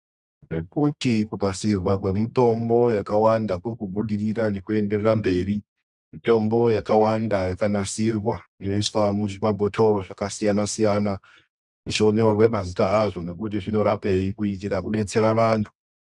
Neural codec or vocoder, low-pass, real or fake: codec, 24 kHz, 0.9 kbps, WavTokenizer, medium music audio release; 10.8 kHz; fake